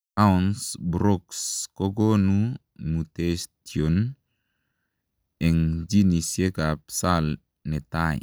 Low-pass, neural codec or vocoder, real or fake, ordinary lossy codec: none; none; real; none